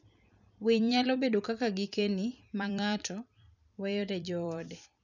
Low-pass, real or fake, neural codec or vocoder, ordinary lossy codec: 7.2 kHz; fake; vocoder, 44.1 kHz, 128 mel bands every 256 samples, BigVGAN v2; none